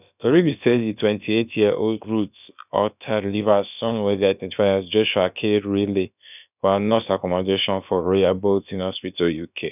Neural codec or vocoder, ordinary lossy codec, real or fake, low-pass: codec, 16 kHz, about 1 kbps, DyCAST, with the encoder's durations; none; fake; 3.6 kHz